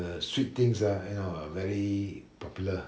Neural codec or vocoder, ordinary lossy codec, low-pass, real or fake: none; none; none; real